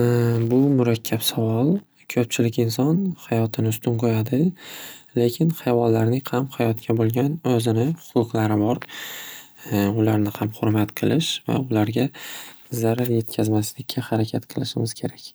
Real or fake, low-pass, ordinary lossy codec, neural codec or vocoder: real; none; none; none